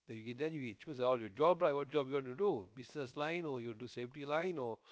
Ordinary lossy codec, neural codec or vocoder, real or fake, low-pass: none; codec, 16 kHz, 0.7 kbps, FocalCodec; fake; none